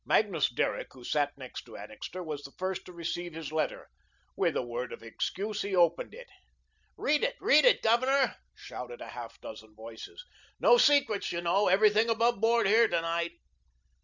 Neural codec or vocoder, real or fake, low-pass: none; real; 7.2 kHz